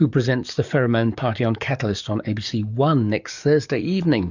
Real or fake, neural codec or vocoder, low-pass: fake; codec, 44.1 kHz, 7.8 kbps, DAC; 7.2 kHz